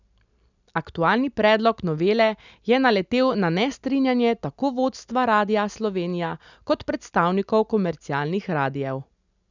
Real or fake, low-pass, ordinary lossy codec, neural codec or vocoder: real; 7.2 kHz; none; none